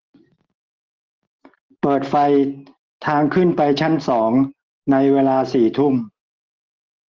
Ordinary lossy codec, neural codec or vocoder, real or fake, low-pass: Opus, 16 kbps; none; real; 7.2 kHz